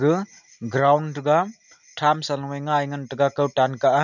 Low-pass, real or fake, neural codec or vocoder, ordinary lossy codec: 7.2 kHz; real; none; none